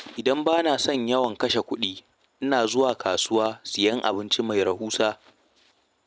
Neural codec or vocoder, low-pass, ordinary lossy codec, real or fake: none; none; none; real